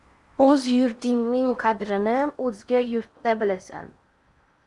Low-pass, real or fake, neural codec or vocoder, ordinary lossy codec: 10.8 kHz; fake; codec, 16 kHz in and 24 kHz out, 0.8 kbps, FocalCodec, streaming, 65536 codes; Opus, 64 kbps